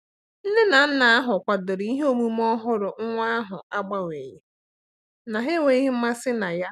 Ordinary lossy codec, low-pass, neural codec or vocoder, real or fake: none; 14.4 kHz; none; real